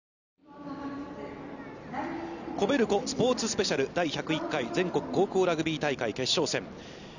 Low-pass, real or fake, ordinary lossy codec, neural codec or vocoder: 7.2 kHz; real; none; none